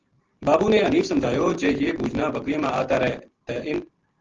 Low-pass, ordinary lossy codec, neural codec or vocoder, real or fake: 7.2 kHz; Opus, 16 kbps; none; real